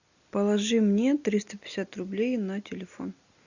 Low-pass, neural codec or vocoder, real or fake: 7.2 kHz; none; real